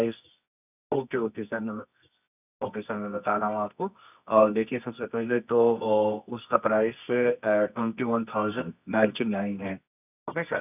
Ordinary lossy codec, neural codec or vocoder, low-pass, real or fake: none; codec, 24 kHz, 0.9 kbps, WavTokenizer, medium music audio release; 3.6 kHz; fake